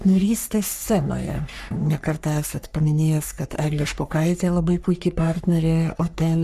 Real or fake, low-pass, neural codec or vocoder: fake; 14.4 kHz; codec, 44.1 kHz, 3.4 kbps, Pupu-Codec